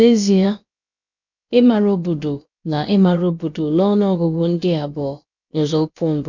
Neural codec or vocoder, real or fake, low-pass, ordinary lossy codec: codec, 16 kHz, about 1 kbps, DyCAST, with the encoder's durations; fake; 7.2 kHz; none